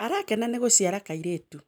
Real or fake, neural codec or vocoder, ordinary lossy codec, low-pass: real; none; none; none